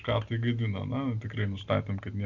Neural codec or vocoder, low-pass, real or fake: none; 7.2 kHz; real